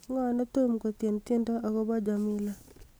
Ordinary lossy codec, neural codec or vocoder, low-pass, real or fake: none; none; none; real